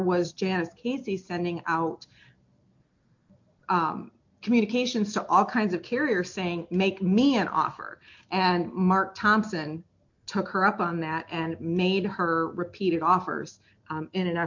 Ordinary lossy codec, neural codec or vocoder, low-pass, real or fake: MP3, 48 kbps; none; 7.2 kHz; real